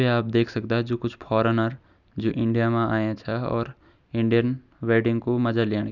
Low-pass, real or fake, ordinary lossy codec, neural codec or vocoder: 7.2 kHz; real; none; none